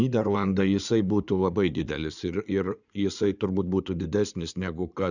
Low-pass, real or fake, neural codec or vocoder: 7.2 kHz; fake; codec, 16 kHz in and 24 kHz out, 2.2 kbps, FireRedTTS-2 codec